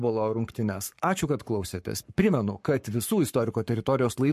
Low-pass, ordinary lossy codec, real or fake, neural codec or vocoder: 14.4 kHz; MP3, 64 kbps; fake; codec, 44.1 kHz, 7.8 kbps, Pupu-Codec